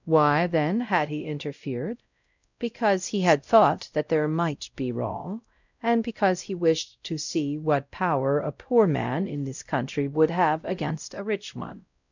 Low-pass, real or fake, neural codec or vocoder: 7.2 kHz; fake; codec, 16 kHz, 0.5 kbps, X-Codec, WavLM features, trained on Multilingual LibriSpeech